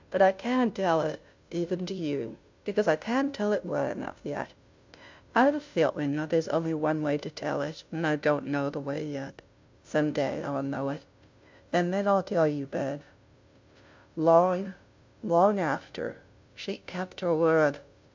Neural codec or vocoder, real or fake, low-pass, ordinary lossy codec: codec, 16 kHz, 0.5 kbps, FunCodec, trained on Chinese and English, 25 frames a second; fake; 7.2 kHz; MP3, 64 kbps